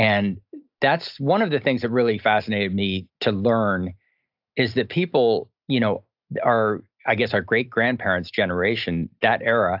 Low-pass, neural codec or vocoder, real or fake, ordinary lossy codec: 5.4 kHz; none; real; AAC, 48 kbps